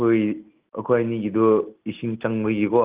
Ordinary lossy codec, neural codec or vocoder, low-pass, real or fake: Opus, 32 kbps; none; 3.6 kHz; real